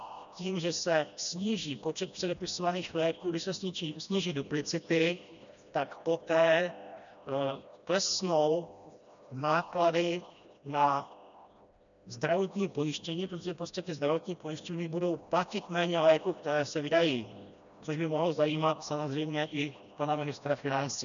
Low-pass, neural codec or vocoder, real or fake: 7.2 kHz; codec, 16 kHz, 1 kbps, FreqCodec, smaller model; fake